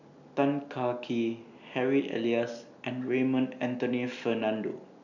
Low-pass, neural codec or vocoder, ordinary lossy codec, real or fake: 7.2 kHz; none; none; real